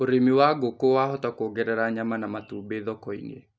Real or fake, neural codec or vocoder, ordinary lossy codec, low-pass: real; none; none; none